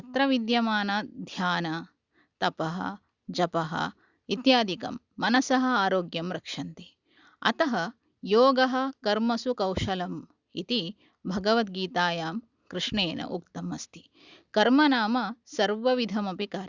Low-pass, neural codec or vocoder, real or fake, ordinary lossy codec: 7.2 kHz; none; real; Opus, 64 kbps